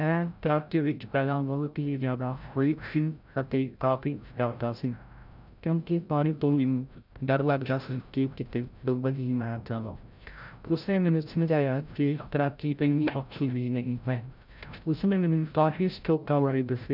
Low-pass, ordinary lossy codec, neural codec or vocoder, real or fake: 5.4 kHz; none; codec, 16 kHz, 0.5 kbps, FreqCodec, larger model; fake